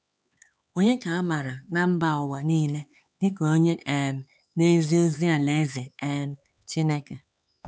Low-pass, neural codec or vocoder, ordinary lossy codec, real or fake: none; codec, 16 kHz, 4 kbps, X-Codec, HuBERT features, trained on LibriSpeech; none; fake